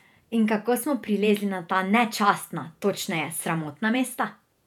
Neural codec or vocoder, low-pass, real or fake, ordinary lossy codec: vocoder, 48 kHz, 128 mel bands, Vocos; 19.8 kHz; fake; none